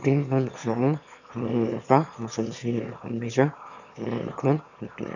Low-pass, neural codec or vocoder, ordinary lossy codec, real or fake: 7.2 kHz; autoencoder, 22.05 kHz, a latent of 192 numbers a frame, VITS, trained on one speaker; none; fake